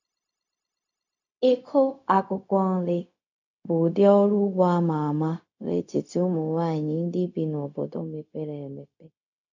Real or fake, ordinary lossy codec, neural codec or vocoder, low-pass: fake; none; codec, 16 kHz, 0.4 kbps, LongCat-Audio-Codec; 7.2 kHz